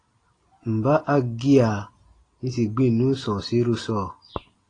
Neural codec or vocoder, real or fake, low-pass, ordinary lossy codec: none; real; 9.9 kHz; AAC, 32 kbps